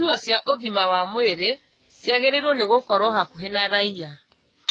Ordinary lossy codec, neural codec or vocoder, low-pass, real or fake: AAC, 32 kbps; codec, 44.1 kHz, 2.6 kbps, SNAC; 9.9 kHz; fake